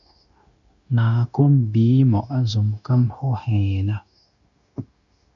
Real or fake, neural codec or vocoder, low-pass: fake; codec, 16 kHz, 0.9 kbps, LongCat-Audio-Codec; 7.2 kHz